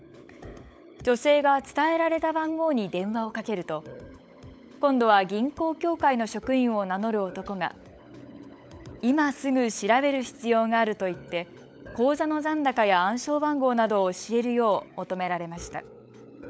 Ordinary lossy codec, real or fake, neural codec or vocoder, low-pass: none; fake; codec, 16 kHz, 16 kbps, FunCodec, trained on LibriTTS, 50 frames a second; none